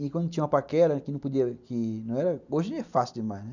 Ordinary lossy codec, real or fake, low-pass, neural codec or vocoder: none; real; 7.2 kHz; none